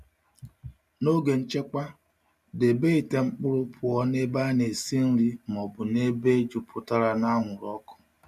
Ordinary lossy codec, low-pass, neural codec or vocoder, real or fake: none; 14.4 kHz; none; real